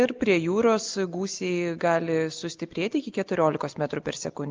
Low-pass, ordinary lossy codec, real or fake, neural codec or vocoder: 7.2 kHz; Opus, 24 kbps; real; none